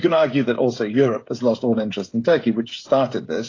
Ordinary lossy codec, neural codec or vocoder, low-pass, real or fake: AAC, 32 kbps; codec, 16 kHz, 16 kbps, FreqCodec, smaller model; 7.2 kHz; fake